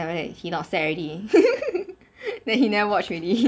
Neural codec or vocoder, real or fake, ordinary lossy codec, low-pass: none; real; none; none